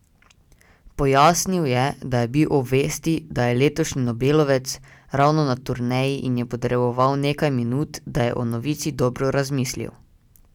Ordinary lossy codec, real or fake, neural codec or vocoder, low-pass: none; real; none; 19.8 kHz